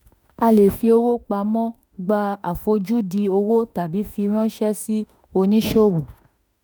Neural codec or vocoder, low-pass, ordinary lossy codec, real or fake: autoencoder, 48 kHz, 32 numbers a frame, DAC-VAE, trained on Japanese speech; none; none; fake